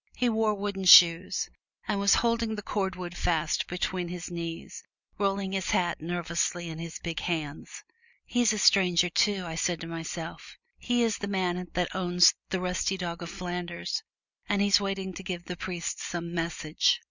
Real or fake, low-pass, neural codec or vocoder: real; 7.2 kHz; none